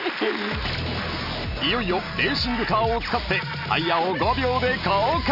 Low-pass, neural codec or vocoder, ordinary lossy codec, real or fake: 5.4 kHz; none; none; real